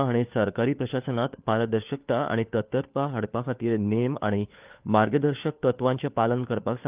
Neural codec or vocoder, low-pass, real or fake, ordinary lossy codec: codec, 16 kHz, 4 kbps, FunCodec, trained on LibriTTS, 50 frames a second; 3.6 kHz; fake; Opus, 32 kbps